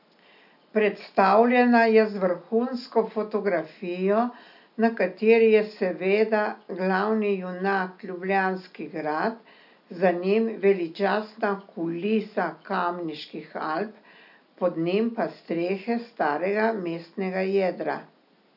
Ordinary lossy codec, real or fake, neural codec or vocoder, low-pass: none; real; none; 5.4 kHz